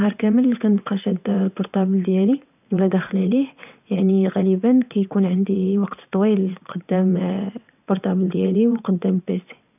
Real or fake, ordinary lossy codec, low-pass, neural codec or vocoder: fake; none; 3.6 kHz; vocoder, 22.05 kHz, 80 mel bands, WaveNeXt